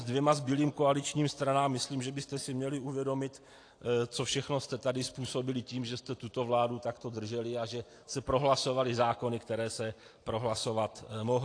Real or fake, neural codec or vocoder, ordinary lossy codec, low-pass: real; none; AAC, 48 kbps; 9.9 kHz